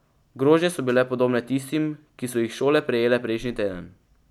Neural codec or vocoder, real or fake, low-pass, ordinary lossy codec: none; real; 19.8 kHz; none